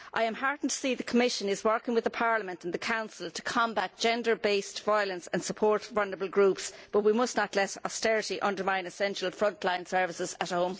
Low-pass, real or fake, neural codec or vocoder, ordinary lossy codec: none; real; none; none